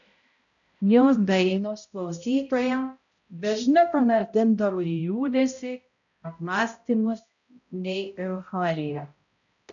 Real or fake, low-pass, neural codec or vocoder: fake; 7.2 kHz; codec, 16 kHz, 0.5 kbps, X-Codec, HuBERT features, trained on balanced general audio